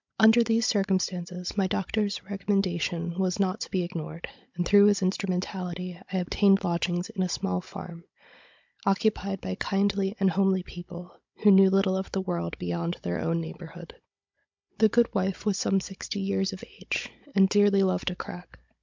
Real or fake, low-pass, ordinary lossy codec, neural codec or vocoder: fake; 7.2 kHz; MP3, 64 kbps; codec, 16 kHz, 16 kbps, FunCodec, trained on Chinese and English, 50 frames a second